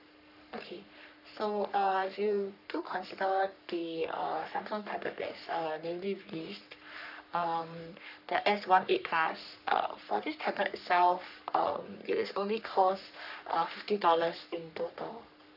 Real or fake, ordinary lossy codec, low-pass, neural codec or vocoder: fake; none; 5.4 kHz; codec, 44.1 kHz, 3.4 kbps, Pupu-Codec